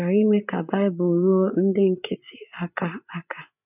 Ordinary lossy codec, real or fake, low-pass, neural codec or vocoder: none; fake; 3.6 kHz; codec, 16 kHz, 8 kbps, FreqCodec, larger model